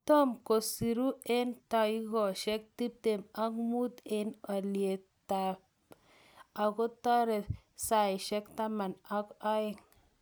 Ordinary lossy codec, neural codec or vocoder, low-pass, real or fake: none; none; none; real